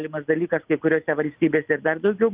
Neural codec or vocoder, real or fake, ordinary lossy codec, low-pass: none; real; Opus, 24 kbps; 3.6 kHz